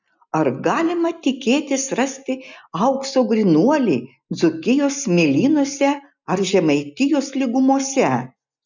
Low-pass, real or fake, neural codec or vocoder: 7.2 kHz; real; none